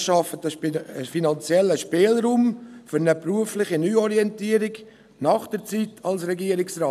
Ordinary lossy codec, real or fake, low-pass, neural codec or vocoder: none; real; 14.4 kHz; none